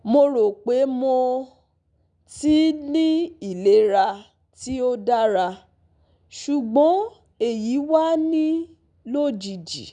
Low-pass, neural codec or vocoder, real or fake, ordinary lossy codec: 9.9 kHz; none; real; none